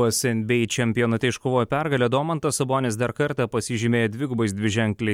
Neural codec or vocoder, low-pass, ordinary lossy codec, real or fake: none; 19.8 kHz; MP3, 96 kbps; real